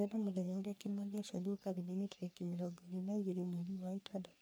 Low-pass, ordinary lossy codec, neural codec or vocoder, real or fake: none; none; codec, 44.1 kHz, 3.4 kbps, Pupu-Codec; fake